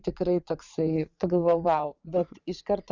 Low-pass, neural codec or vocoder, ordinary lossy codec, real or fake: 7.2 kHz; vocoder, 44.1 kHz, 80 mel bands, Vocos; Opus, 64 kbps; fake